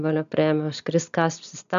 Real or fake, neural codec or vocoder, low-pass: real; none; 7.2 kHz